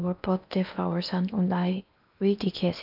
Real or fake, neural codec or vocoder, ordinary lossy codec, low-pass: fake; codec, 16 kHz in and 24 kHz out, 0.8 kbps, FocalCodec, streaming, 65536 codes; none; 5.4 kHz